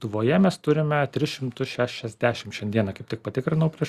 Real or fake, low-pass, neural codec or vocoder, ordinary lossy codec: real; 14.4 kHz; none; Opus, 64 kbps